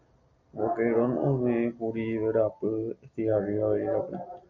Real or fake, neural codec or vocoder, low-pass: real; none; 7.2 kHz